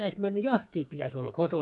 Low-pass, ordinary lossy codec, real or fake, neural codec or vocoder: 10.8 kHz; none; fake; codec, 44.1 kHz, 2.6 kbps, SNAC